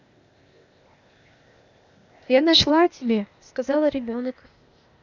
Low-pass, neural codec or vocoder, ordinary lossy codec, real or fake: 7.2 kHz; codec, 16 kHz, 0.8 kbps, ZipCodec; none; fake